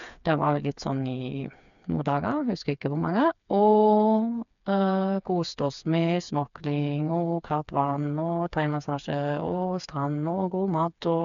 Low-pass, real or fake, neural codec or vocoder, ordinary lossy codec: 7.2 kHz; fake; codec, 16 kHz, 4 kbps, FreqCodec, smaller model; MP3, 96 kbps